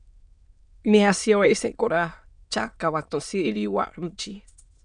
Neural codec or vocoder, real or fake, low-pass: autoencoder, 22.05 kHz, a latent of 192 numbers a frame, VITS, trained on many speakers; fake; 9.9 kHz